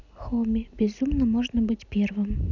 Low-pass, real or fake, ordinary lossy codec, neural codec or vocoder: 7.2 kHz; real; none; none